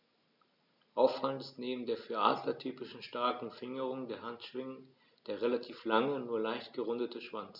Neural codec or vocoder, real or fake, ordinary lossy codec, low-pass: none; real; none; 5.4 kHz